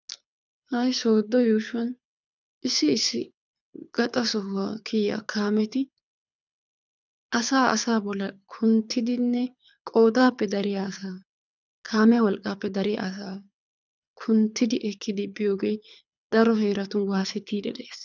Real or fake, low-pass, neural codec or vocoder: fake; 7.2 kHz; codec, 24 kHz, 6 kbps, HILCodec